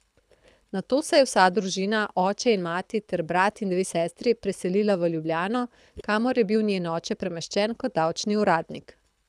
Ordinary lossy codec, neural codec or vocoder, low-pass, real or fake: none; codec, 24 kHz, 6 kbps, HILCodec; none; fake